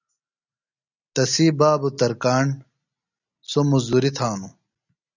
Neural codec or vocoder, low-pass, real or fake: none; 7.2 kHz; real